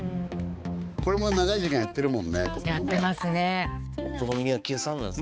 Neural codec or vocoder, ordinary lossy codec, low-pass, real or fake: codec, 16 kHz, 4 kbps, X-Codec, HuBERT features, trained on balanced general audio; none; none; fake